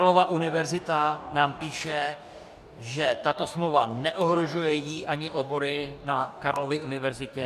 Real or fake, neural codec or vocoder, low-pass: fake; codec, 44.1 kHz, 2.6 kbps, DAC; 14.4 kHz